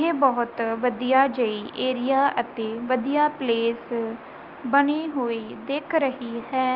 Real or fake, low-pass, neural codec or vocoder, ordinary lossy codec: real; 5.4 kHz; none; Opus, 24 kbps